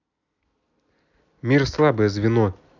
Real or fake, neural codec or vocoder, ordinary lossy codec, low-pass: real; none; none; 7.2 kHz